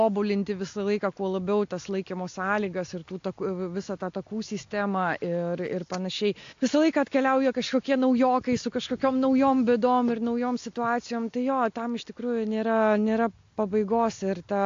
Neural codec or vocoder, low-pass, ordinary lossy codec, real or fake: none; 7.2 kHz; AAC, 48 kbps; real